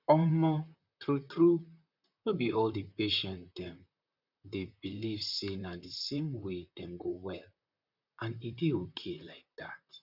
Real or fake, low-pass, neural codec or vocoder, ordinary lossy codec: fake; 5.4 kHz; vocoder, 44.1 kHz, 128 mel bands, Pupu-Vocoder; none